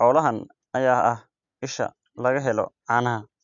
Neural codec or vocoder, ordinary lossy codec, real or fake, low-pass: none; none; real; 7.2 kHz